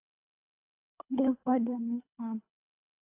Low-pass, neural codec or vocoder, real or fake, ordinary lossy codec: 3.6 kHz; codec, 24 kHz, 3 kbps, HILCodec; fake; AAC, 24 kbps